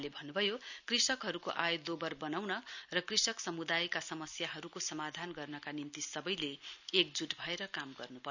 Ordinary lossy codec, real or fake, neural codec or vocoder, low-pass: none; real; none; 7.2 kHz